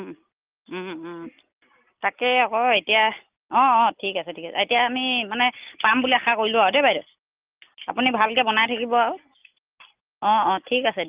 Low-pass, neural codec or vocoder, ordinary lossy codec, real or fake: 3.6 kHz; none; Opus, 32 kbps; real